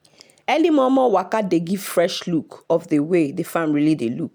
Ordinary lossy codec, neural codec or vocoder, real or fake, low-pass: none; none; real; none